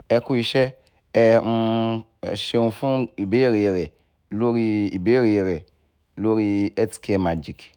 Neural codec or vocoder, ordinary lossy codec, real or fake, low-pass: autoencoder, 48 kHz, 128 numbers a frame, DAC-VAE, trained on Japanese speech; none; fake; 19.8 kHz